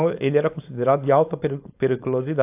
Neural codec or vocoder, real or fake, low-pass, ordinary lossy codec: codec, 16 kHz, 4.8 kbps, FACodec; fake; 3.6 kHz; AAC, 32 kbps